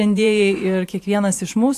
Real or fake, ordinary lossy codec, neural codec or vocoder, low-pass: fake; AAC, 64 kbps; vocoder, 44.1 kHz, 128 mel bands every 512 samples, BigVGAN v2; 14.4 kHz